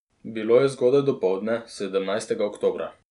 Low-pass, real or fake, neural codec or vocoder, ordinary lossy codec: 10.8 kHz; real; none; none